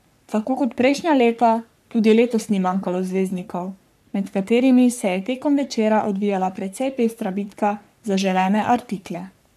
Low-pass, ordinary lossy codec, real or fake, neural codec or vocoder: 14.4 kHz; none; fake; codec, 44.1 kHz, 3.4 kbps, Pupu-Codec